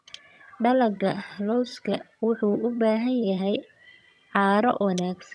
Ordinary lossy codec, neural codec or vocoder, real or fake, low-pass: none; vocoder, 22.05 kHz, 80 mel bands, HiFi-GAN; fake; none